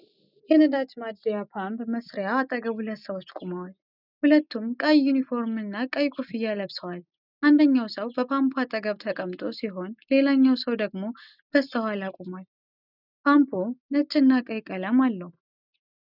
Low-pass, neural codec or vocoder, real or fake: 5.4 kHz; none; real